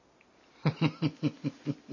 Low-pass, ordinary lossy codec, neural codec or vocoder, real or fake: 7.2 kHz; none; none; real